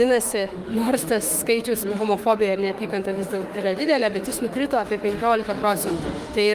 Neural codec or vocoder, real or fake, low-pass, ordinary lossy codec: autoencoder, 48 kHz, 32 numbers a frame, DAC-VAE, trained on Japanese speech; fake; 14.4 kHz; Opus, 32 kbps